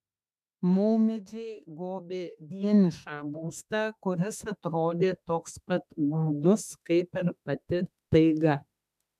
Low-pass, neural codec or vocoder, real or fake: 14.4 kHz; autoencoder, 48 kHz, 32 numbers a frame, DAC-VAE, trained on Japanese speech; fake